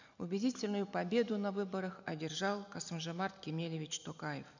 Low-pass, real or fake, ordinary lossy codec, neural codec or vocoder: 7.2 kHz; real; none; none